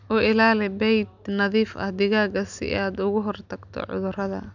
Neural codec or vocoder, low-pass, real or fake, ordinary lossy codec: none; 7.2 kHz; real; none